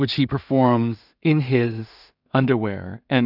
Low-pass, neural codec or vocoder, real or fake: 5.4 kHz; codec, 16 kHz in and 24 kHz out, 0.4 kbps, LongCat-Audio-Codec, two codebook decoder; fake